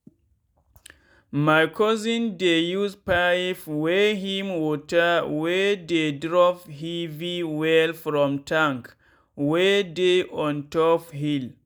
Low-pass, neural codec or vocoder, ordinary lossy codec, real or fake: none; none; none; real